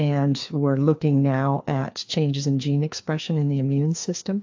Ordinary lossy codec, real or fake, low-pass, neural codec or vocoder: AAC, 48 kbps; fake; 7.2 kHz; codec, 16 kHz, 2 kbps, FreqCodec, larger model